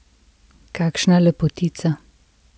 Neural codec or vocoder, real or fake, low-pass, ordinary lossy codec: none; real; none; none